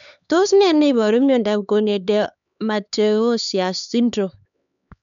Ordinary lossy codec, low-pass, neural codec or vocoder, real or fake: none; 7.2 kHz; codec, 16 kHz, 4 kbps, X-Codec, HuBERT features, trained on LibriSpeech; fake